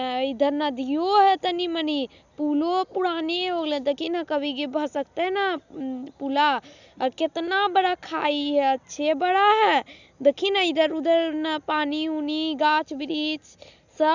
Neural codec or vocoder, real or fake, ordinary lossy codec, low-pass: none; real; none; 7.2 kHz